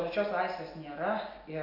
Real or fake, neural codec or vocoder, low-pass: real; none; 5.4 kHz